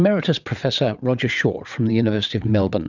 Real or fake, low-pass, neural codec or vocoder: fake; 7.2 kHz; vocoder, 22.05 kHz, 80 mel bands, WaveNeXt